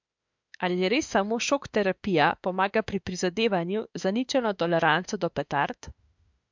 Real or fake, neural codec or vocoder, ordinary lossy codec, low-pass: fake; autoencoder, 48 kHz, 32 numbers a frame, DAC-VAE, trained on Japanese speech; MP3, 64 kbps; 7.2 kHz